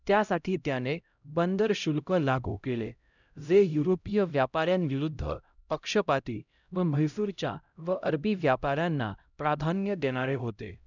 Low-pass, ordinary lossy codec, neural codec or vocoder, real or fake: 7.2 kHz; none; codec, 16 kHz, 0.5 kbps, X-Codec, HuBERT features, trained on LibriSpeech; fake